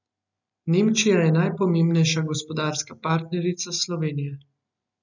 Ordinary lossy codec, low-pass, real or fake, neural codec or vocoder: none; 7.2 kHz; real; none